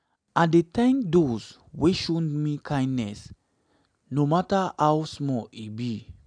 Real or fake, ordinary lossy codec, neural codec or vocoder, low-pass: real; none; none; 9.9 kHz